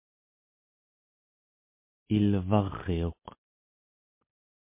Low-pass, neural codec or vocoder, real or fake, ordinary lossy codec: 3.6 kHz; none; real; MP3, 24 kbps